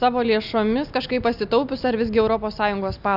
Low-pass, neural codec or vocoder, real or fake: 5.4 kHz; none; real